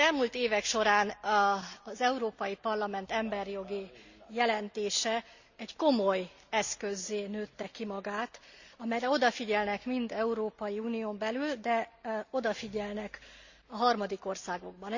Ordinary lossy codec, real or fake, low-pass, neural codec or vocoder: Opus, 64 kbps; real; 7.2 kHz; none